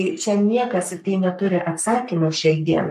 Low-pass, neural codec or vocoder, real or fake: 14.4 kHz; codec, 44.1 kHz, 3.4 kbps, Pupu-Codec; fake